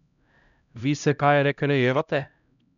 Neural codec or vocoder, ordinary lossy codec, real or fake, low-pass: codec, 16 kHz, 0.5 kbps, X-Codec, HuBERT features, trained on LibriSpeech; none; fake; 7.2 kHz